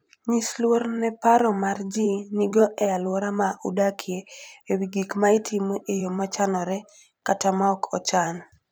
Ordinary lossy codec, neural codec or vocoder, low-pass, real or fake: none; vocoder, 44.1 kHz, 128 mel bands every 512 samples, BigVGAN v2; none; fake